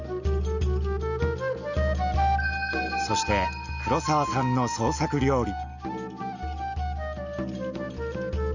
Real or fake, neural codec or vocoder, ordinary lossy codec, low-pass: real; none; none; 7.2 kHz